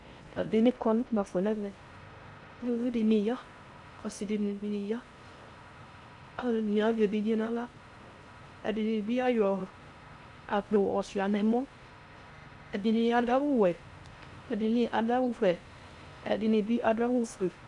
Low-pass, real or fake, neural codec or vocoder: 10.8 kHz; fake; codec, 16 kHz in and 24 kHz out, 0.6 kbps, FocalCodec, streaming, 4096 codes